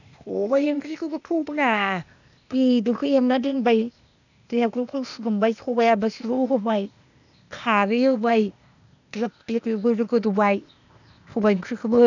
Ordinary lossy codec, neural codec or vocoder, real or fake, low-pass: none; codec, 16 kHz, 0.8 kbps, ZipCodec; fake; 7.2 kHz